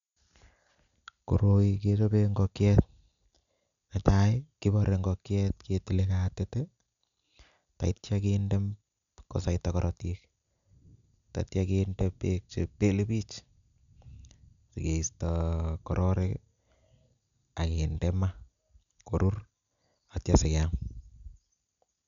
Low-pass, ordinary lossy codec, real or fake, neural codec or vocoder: 7.2 kHz; none; real; none